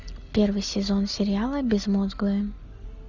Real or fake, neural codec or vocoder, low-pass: real; none; 7.2 kHz